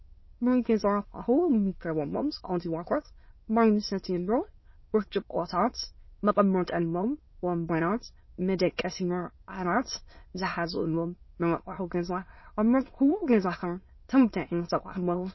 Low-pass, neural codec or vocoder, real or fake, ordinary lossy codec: 7.2 kHz; autoencoder, 22.05 kHz, a latent of 192 numbers a frame, VITS, trained on many speakers; fake; MP3, 24 kbps